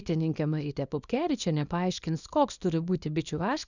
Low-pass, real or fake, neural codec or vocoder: 7.2 kHz; fake; codec, 24 kHz, 0.9 kbps, WavTokenizer, small release